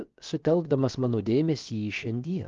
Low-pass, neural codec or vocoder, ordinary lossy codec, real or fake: 7.2 kHz; codec, 16 kHz, about 1 kbps, DyCAST, with the encoder's durations; Opus, 32 kbps; fake